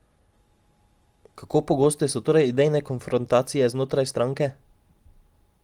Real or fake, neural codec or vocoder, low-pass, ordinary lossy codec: real; none; 19.8 kHz; Opus, 24 kbps